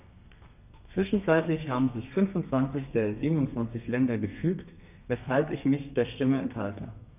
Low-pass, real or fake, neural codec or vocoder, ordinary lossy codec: 3.6 kHz; fake; codec, 32 kHz, 1.9 kbps, SNAC; none